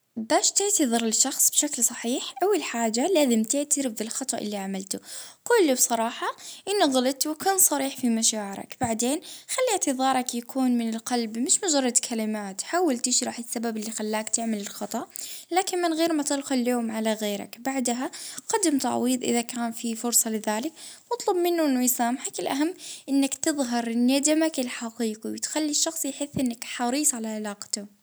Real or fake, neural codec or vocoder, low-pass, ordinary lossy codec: real; none; none; none